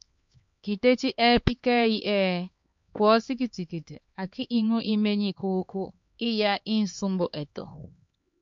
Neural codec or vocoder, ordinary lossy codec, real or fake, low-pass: codec, 16 kHz, 4 kbps, X-Codec, HuBERT features, trained on LibriSpeech; MP3, 48 kbps; fake; 7.2 kHz